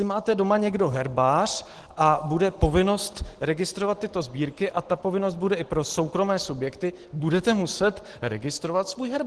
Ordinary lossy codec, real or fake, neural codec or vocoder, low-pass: Opus, 16 kbps; real; none; 10.8 kHz